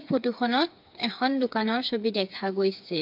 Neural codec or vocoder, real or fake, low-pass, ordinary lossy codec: codec, 16 kHz, 4 kbps, FreqCodec, smaller model; fake; 5.4 kHz; MP3, 48 kbps